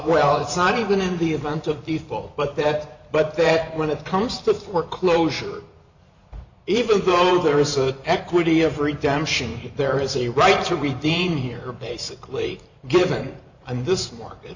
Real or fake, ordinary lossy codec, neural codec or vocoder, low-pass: fake; Opus, 64 kbps; vocoder, 44.1 kHz, 128 mel bands every 512 samples, BigVGAN v2; 7.2 kHz